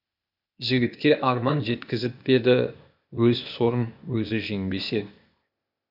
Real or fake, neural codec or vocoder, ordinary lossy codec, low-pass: fake; codec, 16 kHz, 0.8 kbps, ZipCodec; none; 5.4 kHz